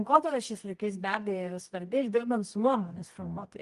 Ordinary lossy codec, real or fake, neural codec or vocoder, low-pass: Opus, 24 kbps; fake; codec, 24 kHz, 0.9 kbps, WavTokenizer, medium music audio release; 10.8 kHz